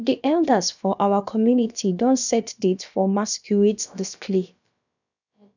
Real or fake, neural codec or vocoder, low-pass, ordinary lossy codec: fake; codec, 16 kHz, about 1 kbps, DyCAST, with the encoder's durations; 7.2 kHz; none